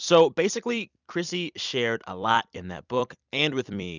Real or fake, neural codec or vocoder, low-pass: fake; vocoder, 44.1 kHz, 128 mel bands every 256 samples, BigVGAN v2; 7.2 kHz